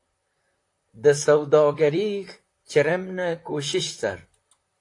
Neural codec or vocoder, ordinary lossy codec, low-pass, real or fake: vocoder, 44.1 kHz, 128 mel bands, Pupu-Vocoder; AAC, 48 kbps; 10.8 kHz; fake